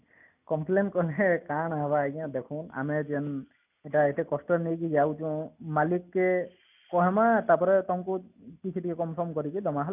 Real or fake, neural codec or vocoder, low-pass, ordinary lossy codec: real; none; 3.6 kHz; none